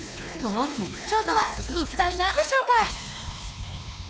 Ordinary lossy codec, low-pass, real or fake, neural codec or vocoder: none; none; fake; codec, 16 kHz, 2 kbps, X-Codec, WavLM features, trained on Multilingual LibriSpeech